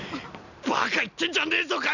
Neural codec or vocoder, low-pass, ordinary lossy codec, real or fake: none; 7.2 kHz; none; real